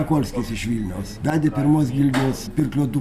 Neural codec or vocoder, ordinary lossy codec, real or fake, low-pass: none; Opus, 64 kbps; real; 14.4 kHz